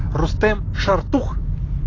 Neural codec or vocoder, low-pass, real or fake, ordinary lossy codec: none; 7.2 kHz; real; AAC, 32 kbps